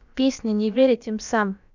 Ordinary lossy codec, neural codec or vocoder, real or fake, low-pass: none; codec, 16 kHz, about 1 kbps, DyCAST, with the encoder's durations; fake; 7.2 kHz